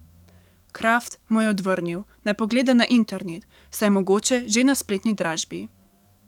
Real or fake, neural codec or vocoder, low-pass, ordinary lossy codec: fake; codec, 44.1 kHz, 7.8 kbps, DAC; 19.8 kHz; none